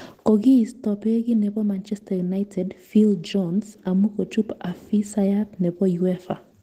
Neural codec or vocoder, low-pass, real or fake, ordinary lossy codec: none; 14.4 kHz; real; Opus, 16 kbps